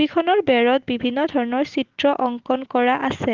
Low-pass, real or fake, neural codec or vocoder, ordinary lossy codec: 7.2 kHz; real; none; Opus, 16 kbps